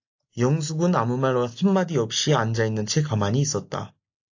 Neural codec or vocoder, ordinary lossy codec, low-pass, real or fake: none; AAC, 48 kbps; 7.2 kHz; real